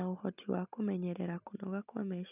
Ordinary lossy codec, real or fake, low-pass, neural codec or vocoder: none; real; 3.6 kHz; none